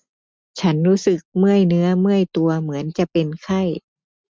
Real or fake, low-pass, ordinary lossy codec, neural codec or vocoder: real; none; none; none